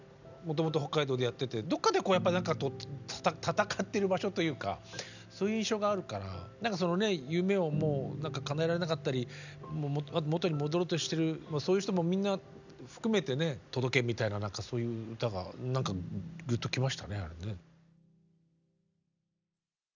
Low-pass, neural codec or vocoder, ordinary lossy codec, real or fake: 7.2 kHz; none; none; real